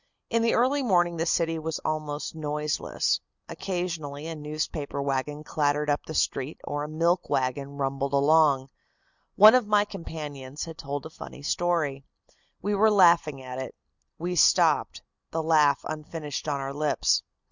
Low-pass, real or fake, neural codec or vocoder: 7.2 kHz; real; none